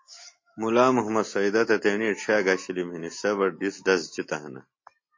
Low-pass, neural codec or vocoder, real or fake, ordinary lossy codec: 7.2 kHz; none; real; MP3, 32 kbps